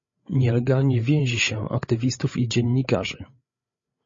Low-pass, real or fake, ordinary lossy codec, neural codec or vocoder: 7.2 kHz; fake; MP3, 32 kbps; codec, 16 kHz, 8 kbps, FreqCodec, larger model